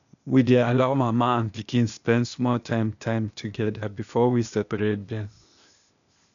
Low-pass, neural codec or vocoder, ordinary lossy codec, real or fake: 7.2 kHz; codec, 16 kHz, 0.8 kbps, ZipCodec; none; fake